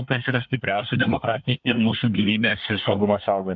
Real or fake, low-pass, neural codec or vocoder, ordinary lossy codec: fake; 7.2 kHz; codec, 24 kHz, 1 kbps, SNAC; MP3, 64 kbps